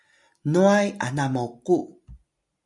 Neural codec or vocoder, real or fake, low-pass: none; real; 10.8 kHz